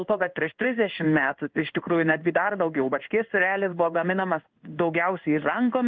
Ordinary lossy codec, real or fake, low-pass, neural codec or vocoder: Opus, 24 kbps; fake; 7.2 kHz; codec, 16 kHz in and 24 kHz out, 1 kbps, XY-Tokenizer